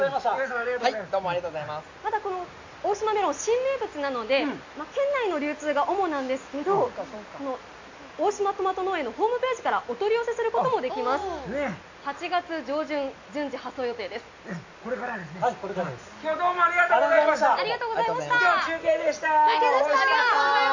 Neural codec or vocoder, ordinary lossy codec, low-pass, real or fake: none; AAC, 48 kbps; 7.2 kHz; real